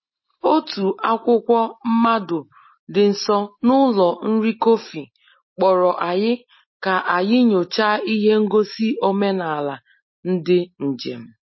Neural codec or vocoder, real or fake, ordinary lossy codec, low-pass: none; real; MP3, 24 kbps; 7.2 kHz